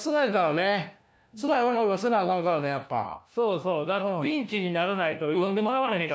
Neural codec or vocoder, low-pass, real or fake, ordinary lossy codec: codec, 16 kHz, 1 kbps, FunCodec, trained on LibriTTS, 50 frames a second; none; fake; none